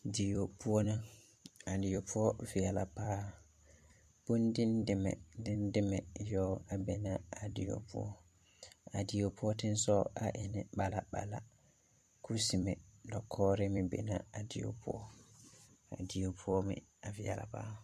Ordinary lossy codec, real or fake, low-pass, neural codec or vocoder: MP3, 64 kbps; fake; 14.4 kHz; vocoder, 44.1 kHz, 128 mel bands every 256 samples, BigVGAN v2